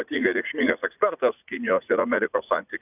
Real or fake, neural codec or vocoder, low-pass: fake; vocoder, 44.1 kHz, 80 mel bands, Vocos; 3.6 kHz